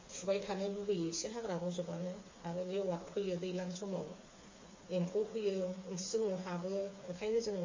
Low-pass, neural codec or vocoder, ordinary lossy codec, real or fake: 7.2 kHz; codec, 16 kHz, 4 kbps, FreqCodec, smaller model; MP3, 32 kbps; fake